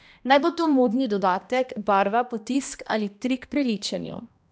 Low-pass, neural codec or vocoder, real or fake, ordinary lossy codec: none; codec, 16 kHz, 1 kbps, X-Codec, HuBERT features, trained on balanced general audio; fake; none